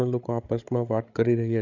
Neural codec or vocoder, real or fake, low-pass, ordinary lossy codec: codec, 16 kHz, 8 kbps, FreqCodec, larger model; fake; 7.2 kHz; MP3, 64 kbps